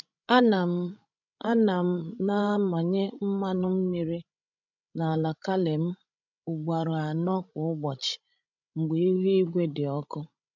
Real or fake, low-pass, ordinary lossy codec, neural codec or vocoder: fake; 7.2 kHz; none; codec, 16 kHz, 16 kbps, FreqCodec, larger model